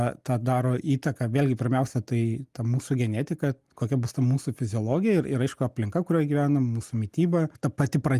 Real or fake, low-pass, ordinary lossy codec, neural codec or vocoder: real; 14.4 kHz; Opus, 32 kbps; none